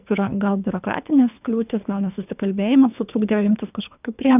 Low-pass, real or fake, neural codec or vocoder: 3.6 kHz; fake; codec, 24 kHz, 3 kbps, HILCodec